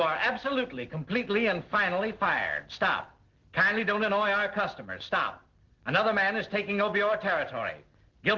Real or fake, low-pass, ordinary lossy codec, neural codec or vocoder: real; 7.2 kHz; Opus, 16 kbps; none